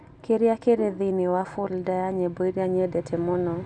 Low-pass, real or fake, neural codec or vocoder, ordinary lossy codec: 10.8 kHz; real; none; none